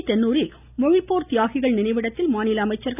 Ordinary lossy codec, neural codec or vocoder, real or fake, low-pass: none; none; real; 3.6 kHz